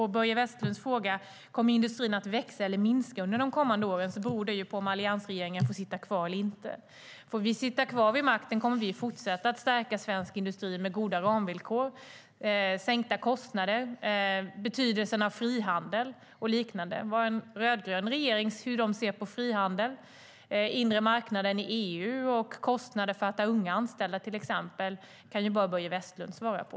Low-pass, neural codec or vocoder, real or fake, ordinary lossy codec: none; none; real; none